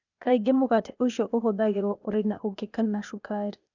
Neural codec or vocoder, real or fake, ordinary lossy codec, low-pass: codec, 16 kHz, 0.8 kbps, ZipCodec; fake; none; 7.2 kHz